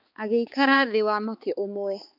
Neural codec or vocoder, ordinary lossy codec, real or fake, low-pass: codec, 16 kHz, 4 kbps, X-Codec, HuBERT features, trained on balanced general audio; MP3, 48 kbps; fake; 5.4 kHz